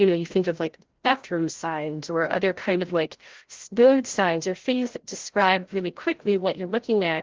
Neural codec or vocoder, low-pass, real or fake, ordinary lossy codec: codec, 16 kHz, 0.5 kbps, FreqCodec, larger model; 7.2 kHz; fake; Opus, 16 kbps